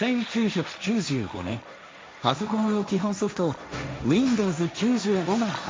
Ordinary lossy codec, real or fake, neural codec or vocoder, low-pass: none; fake; codec, 16 kHz, 1.1 kbps, Voila-Tokenizer; none